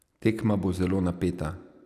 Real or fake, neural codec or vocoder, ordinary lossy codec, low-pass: real; none; none; 14.4 kHz